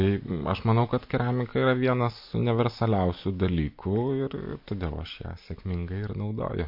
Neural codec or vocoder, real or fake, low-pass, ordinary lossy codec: none; real; 5.4 kHz; MP3, 32 kbps